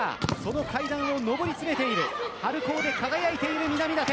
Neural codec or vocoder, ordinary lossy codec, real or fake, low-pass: none; none; real; none